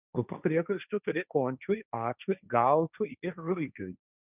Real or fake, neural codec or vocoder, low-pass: fake; codec, 16 kHz, 1.1 kbps, Voila-Tokenizer; 3.6 kHz